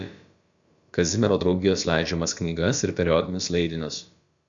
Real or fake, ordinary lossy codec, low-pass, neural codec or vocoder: fake; Opus, 64 kbps; 7.2 kHz; codec, 16 kHz, about 1 kbps, DyCAST, with the encoder's durations